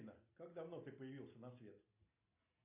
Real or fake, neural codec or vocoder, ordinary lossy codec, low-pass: real; none; AAC, 24 kbps; 3.6 kHz